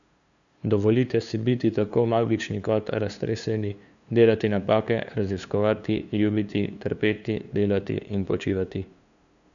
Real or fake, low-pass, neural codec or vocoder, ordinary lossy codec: fake; 7.2 kHz; codec, 16 kHz, 2 kbps, FunCodec, trained on LibriTTS, 25 frames a second; none